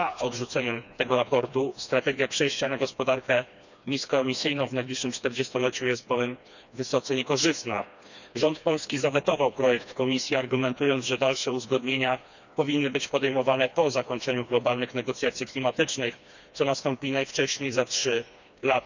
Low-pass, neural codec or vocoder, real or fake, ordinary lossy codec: 7.2 kHz; codec, 16 kHz, 2 kbps, FreqCodec, smaller model; fake; none